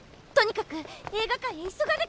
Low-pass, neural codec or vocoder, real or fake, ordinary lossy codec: none; none; real; none